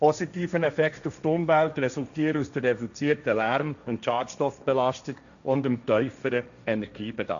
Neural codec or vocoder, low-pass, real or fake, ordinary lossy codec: codec, 16 kHz, 1.1 kbps, Voila-Tokenizer; 7.2 kHz; fake; none